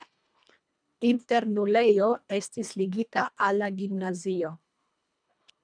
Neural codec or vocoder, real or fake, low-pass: codec, 24 kHz, 1.5 kbps, HILCodec; fake; 9.9 kHz